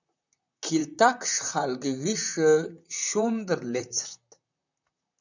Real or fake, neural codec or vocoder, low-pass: fake; vocoder, 44.1 kHz, 128 mel bands, Pupu-Vocoder; 7.2 kHz